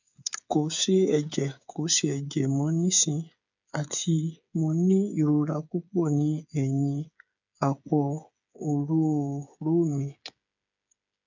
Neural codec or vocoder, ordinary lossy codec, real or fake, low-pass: codec, 16 kHz, 8 kbps, FreqCodec, smaller model; none; fake; 7.2 kHz